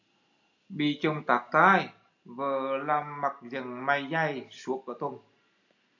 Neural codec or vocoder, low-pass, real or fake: none; 7.2 kHz; real